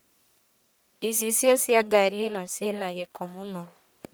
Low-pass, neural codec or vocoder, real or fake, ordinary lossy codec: none; codec, 44.1 kHz, 1.7 kbps, Pupu-Codec; fake; none